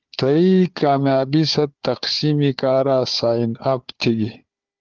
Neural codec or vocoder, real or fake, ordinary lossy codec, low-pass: codec, 16 kHz, 4 kbps, FunCodec, trained on Chinese and English, 50 frames a second; fake; Opus, 24 kbps; 7.2 kHz